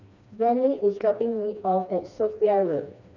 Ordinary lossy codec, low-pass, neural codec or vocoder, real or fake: none; 7.2 kHz; codec, 16 kHz, 2 kbps, FreqCodec, smaller model; fake